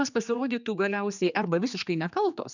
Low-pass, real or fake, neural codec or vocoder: 7.2 kHz; fake; codec, 16 kHz, 2 kbps, X-Codec, HuBERT features, trained on general audio